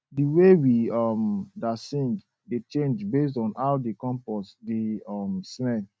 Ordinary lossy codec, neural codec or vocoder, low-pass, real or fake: none; none; none; real